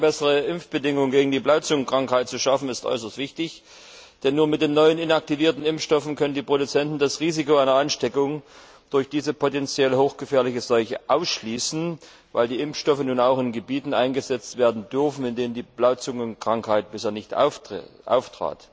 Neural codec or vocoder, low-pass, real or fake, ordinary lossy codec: none; none; real; none